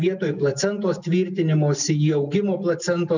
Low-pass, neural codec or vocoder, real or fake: 7.2 kHz; none; real